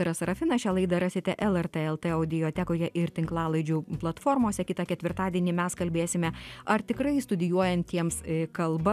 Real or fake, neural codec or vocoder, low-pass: real; none; 14.4 kHz